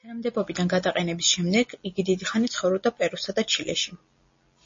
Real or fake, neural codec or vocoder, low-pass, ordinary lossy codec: real; none; 7.2 kHz; MP3, 32 kbps